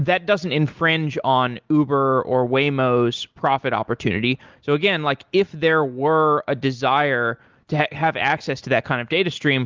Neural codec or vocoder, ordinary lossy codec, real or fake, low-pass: none; Opus, 32 kbps; real; 7.2 kHz